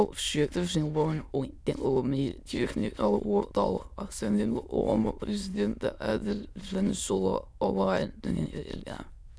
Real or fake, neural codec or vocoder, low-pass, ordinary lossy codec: fake; autoencoder, 22.05 kHz, a latent of 192 numbers a frame, VITS, trained on many speakers; none; none